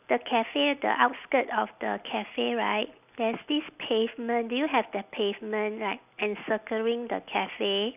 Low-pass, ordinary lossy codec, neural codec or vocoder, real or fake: 3.6 kHz; none; none; real